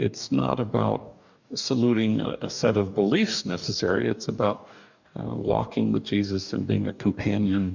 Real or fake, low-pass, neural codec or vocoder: fake; 7.2 kHz; codec, 44.1 kHz, 2.6 kbps, DAC